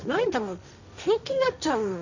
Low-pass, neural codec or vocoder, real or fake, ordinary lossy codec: 7.2 kHz; codec, 16 kHz, 1.1 kbps, Voila-Tokenizer; fake; none